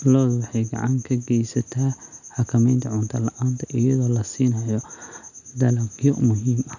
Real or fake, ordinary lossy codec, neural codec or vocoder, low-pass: real; none; none; 7.2 kHz